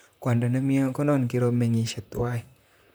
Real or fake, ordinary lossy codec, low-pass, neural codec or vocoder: fake; none; none; vocoder, 44.1 kHz, 128 mel bands, Pupu-Vocoder